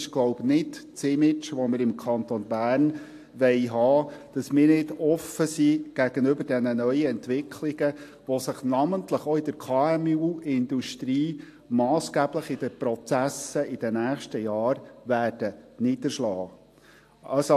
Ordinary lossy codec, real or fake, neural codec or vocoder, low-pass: AAC, 64 kbps; real; none; 14.4 kHz